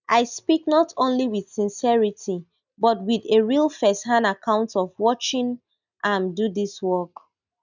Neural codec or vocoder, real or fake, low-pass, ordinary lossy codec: none; real; 7.2 kHz; none